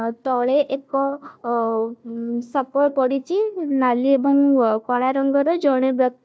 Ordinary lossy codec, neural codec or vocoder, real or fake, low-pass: none; codec, 16 kHz, 1 kbps, FunCodec, trained on Chinese and English, 50 frames a second; fake; none